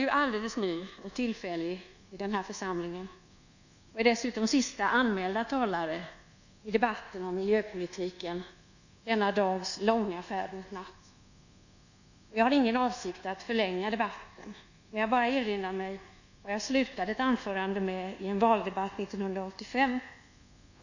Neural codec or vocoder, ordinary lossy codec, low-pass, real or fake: codec, 24 kHz, 1.2 kbps, DualCodec; none; 7.2 kHz; fake